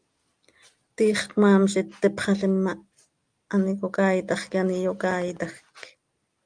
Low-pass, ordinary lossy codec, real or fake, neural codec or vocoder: 9.9 kHz; Opus, 32 kbps; real; none